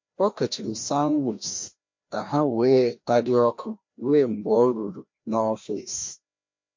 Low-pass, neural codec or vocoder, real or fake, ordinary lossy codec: 7.2 kHz; codec, 16 kHz, 1 kbps, FreqCodec, larger model; fake; MP3, 48 kbps